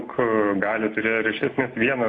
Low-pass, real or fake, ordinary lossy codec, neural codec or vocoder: 9.9 kHz; real; AAC, 32 kbps; none